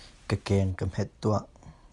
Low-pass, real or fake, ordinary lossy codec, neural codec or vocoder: 10.8 kHz; real; Opus, 64 kbps; none